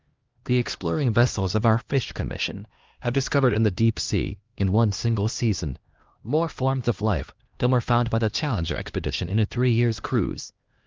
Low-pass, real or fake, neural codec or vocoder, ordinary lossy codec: 7.2 kHz; fake; codec, 16 kHz, 1 kbps, X-Codec, HuBERT features, trained on LibriSpeech; Opus, 24 kbps